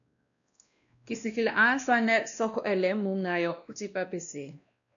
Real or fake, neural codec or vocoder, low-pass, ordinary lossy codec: fake; codec, 16 kHz, 1 kbps, X-Codec, WavLM features, trained on Multilingual LibriSpeech; 7.2 kHz; MP3, 96 kbps